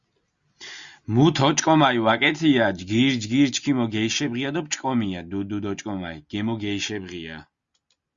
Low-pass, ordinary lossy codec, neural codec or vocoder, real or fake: 7.2 kHz; Opus, 64 kbps; none; real